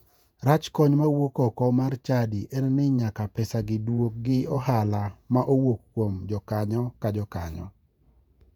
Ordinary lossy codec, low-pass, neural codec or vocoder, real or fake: none; 19.8 kHz; vocoder, 48 kHz, 128 mel bands, Vocos; fake